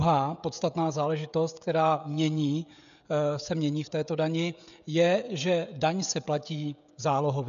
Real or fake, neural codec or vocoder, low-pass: fake; codec, 16 kHz, 16 kbps, FreqCodec, smaller model; 7.2 kHz